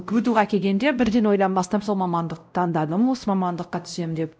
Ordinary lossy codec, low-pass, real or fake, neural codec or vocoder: none; none; fake; codec, 16 kHz, 0.5 kbps, X-Codec, WavLM features, trained on Multilingual LibriSpeech